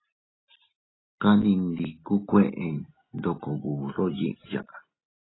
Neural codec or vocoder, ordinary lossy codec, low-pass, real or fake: none; AAC, 16 kbps; 7.2 kHz; real